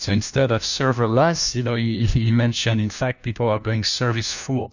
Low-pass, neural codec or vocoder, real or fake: 7.2 kHz; codec, 16 kHz, 1 kbps, FunCodec, trained on LibriTTS, 50 frames a second; fake